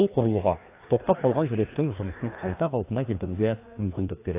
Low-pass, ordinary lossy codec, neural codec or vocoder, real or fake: 3.6 kHz; AAC, 24 kbps; codec, 16 kHz, 2 kbps, FreqCodec, larger model; fake